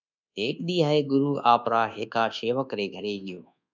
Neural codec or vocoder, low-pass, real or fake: codec, 24 kHz, 1.2 kbps, DualCodec; 7.2 kHz; fake